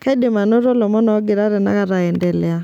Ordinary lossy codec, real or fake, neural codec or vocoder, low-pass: none; real; none; 19.8 kHz